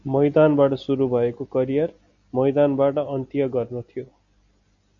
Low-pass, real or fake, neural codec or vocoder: 7.2 kHz; real; none